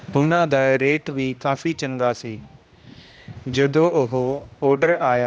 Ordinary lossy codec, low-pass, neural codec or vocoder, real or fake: none; none; codec, 16 kHz, 1 kbps, X-Codec, HuBERT features, trained on general audio; fake